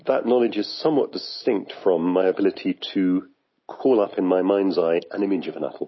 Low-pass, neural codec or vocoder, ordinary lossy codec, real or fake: 7.2 kHz; none; MP3, 24 kbps; real